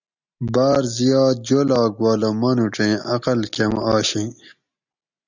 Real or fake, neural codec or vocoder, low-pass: real; none; 7.2 kHz